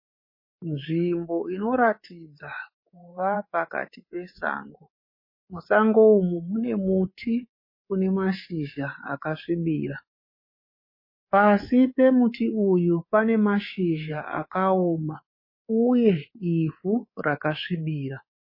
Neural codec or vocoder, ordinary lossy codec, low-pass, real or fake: codec, 24 kHz, 3.1 kbps, DualCodec; MP3, 24 kbps; 5.4 kHz; fake